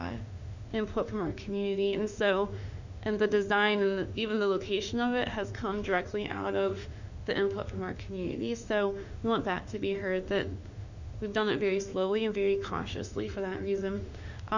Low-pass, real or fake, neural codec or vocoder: 7.2 kHz; fake; autoencoder, 48 kHz, 32 numbers a frame, DAC-VAE, trained on Japanese speech